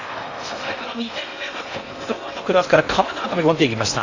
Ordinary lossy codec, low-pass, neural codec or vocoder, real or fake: AAC, 48 kbps; 7.2 kHz; codec, 16 kHz in and 24 kHz out, 0.8 kbps, FocalCodec, streaming, 65536 codes; fake